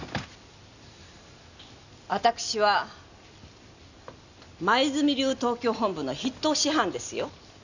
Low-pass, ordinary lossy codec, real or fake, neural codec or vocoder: 7.2 kHz; none; real; none